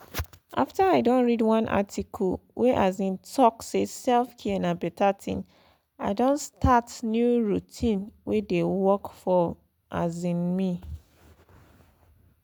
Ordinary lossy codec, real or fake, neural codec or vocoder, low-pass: none; real; none; none